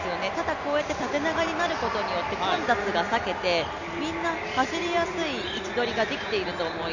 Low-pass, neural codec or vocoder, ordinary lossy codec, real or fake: 7.2 kHz; none; none; real